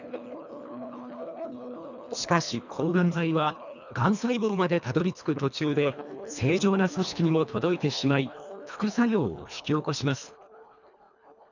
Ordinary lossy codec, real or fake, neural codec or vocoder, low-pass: none; fake; codec, 24 kHz, 1.5 kbps, HILCodec; 7.2 kHz